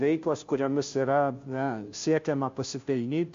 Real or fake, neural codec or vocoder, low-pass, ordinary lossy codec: fake; codec, 16 kHz, 0.5 kbps, FunCodec, trained on Chinese and English, 25 frames a second; 7.2 kHz; MP3, 48 kbps